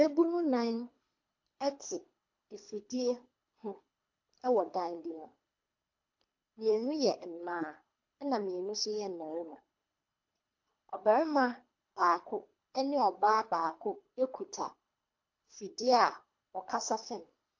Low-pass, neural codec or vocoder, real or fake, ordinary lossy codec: 7.2 kHz; codec, 24 kHz, 3 kbps, HILCodec; fake; MP3, 48 kbps